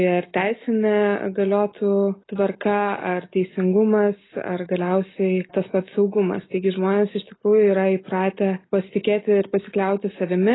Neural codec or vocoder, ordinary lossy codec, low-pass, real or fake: none; AAC, 16 kbps; 7.2 kHz; real